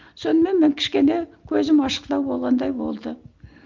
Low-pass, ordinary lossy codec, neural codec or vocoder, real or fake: 7.2 kHz; Opus, 24 kbps; none; real